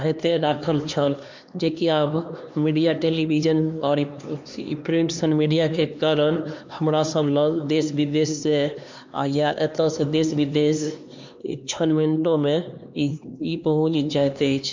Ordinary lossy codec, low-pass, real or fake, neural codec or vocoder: MP3, 64 kbps; 7.2 kHz; fake; codec, 16 kHz, 2 kbps, X-Codec, HuBERT features, trained on LibriSpeech